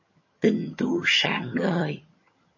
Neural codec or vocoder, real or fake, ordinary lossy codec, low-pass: vocoder, 22.05 kHz, 80 mel bands, HiFi-GAN; fake; MP3, 32 kbps; 7.2 kHz